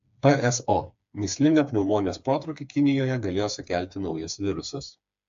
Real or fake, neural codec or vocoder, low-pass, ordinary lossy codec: fake; codec, 16 kHz, 4 kbps, FreqCodec, smaller model; 7.2 kHz; AAC, 64 kbps